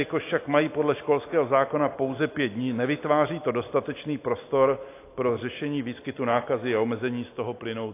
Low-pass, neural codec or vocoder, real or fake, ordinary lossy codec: 3.6 kHz; none; real; AAC, 24 kbps